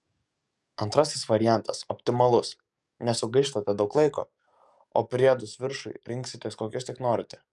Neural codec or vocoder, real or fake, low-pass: codec, 44.1 kHz, 7.8 kbps, DAC; fake; 10.8 kHz